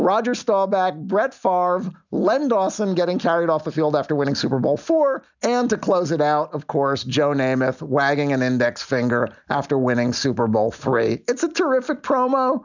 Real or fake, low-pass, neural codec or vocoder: real; 7.2 kHz; none